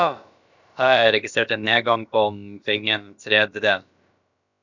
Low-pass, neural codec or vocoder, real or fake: 7.2 kHz; codec, 16 kHz, about 1 kbps, DyCAST, with the encoder's durations; fake